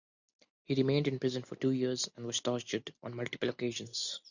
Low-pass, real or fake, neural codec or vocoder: 7.2 kHz; real; none